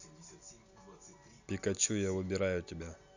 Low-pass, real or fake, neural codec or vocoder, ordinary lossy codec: 7.2 kHz; real; none; none